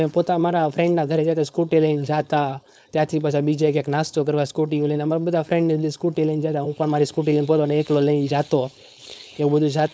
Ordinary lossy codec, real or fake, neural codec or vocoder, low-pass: none; fake; codec, 16 kHz, 4.8 kbps, FACodec; none